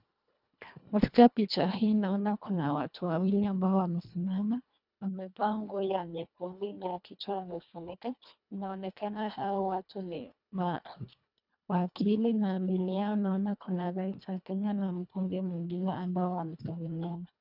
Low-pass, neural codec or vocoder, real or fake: 5.4 kHz; codec, 24 kHz, 1.5 kbps, HILCodec; fake